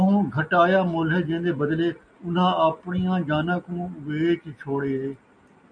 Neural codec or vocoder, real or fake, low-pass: none; real; 9.9 kHz